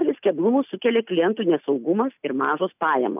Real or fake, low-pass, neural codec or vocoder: real; 3.6 kHz; none